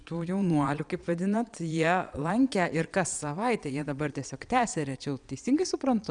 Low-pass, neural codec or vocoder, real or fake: 9.9 kHz; vocoder, 22.05 kHz, 80 mel bands, Vocos; fake